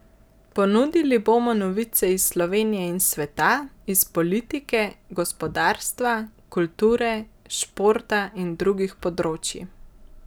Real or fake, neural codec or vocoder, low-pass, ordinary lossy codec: real; none; none; none